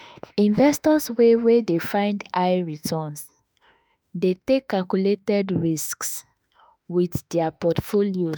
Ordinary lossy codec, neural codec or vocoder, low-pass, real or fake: none; autoencoder, 48 kHz, 32 numbers a frame, DAC-VAE, trained on Japanese speech; none; fake